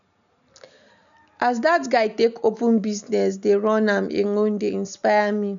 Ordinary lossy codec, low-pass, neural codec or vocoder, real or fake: none; 7.2 kHz; none; real